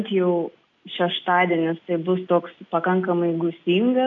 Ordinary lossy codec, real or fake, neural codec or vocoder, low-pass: AAC, 64 kbps; real; none; 7.2 kHz